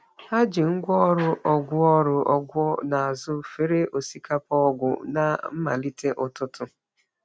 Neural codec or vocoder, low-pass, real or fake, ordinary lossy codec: none; none; real; none